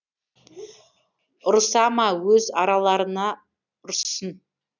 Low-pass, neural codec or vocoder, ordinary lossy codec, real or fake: 7.2 kHz; none; none; real